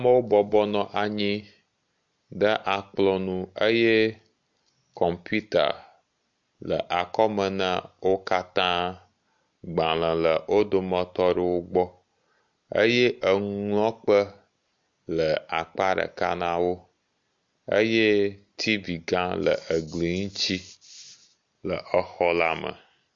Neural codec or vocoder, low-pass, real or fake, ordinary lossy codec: none; 7.2 kHz; real; MP3, 48 kbps